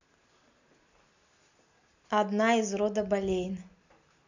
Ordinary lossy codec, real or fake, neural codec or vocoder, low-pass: none; real; none; 7.2 kHz